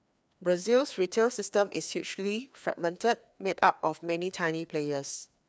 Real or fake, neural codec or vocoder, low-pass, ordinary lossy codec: fake; codec, 16 kHz, 2 kbps, FreqCodec, larger model; none; none